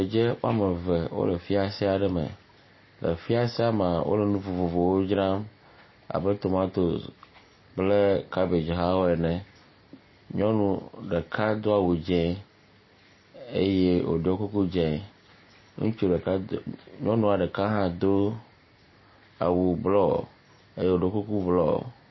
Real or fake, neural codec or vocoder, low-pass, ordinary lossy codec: real; none; 7.2 kHz; MP3, 24 kbps